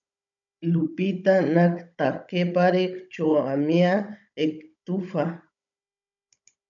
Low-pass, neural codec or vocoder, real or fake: 7.2 kHz; codec, 16 kHz, 16 kbps, FunCodec, trained on Chinese and English, 50 frames a second; fake